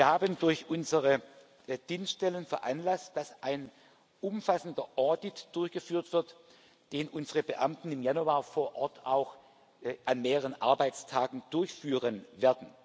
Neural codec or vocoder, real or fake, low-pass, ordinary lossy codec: none; real; none; none